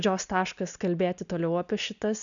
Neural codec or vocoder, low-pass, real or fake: none; 7.2 kHz; real